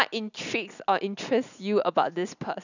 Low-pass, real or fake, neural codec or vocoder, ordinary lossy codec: 7.2 kHz; real; none; none